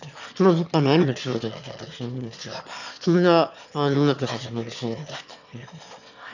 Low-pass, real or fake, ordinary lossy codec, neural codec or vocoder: 7.2 kHz; fake; none; autoencoder, 22.05 kHz, a latent of 192 numbers a frame, VITS, trained on one speaker